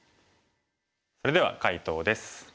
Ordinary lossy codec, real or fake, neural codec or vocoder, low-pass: none; real; none; none